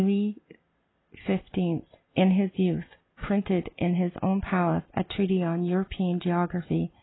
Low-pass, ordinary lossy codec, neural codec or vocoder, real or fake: 7.2 kHz; AAC, 16 kbps; none; real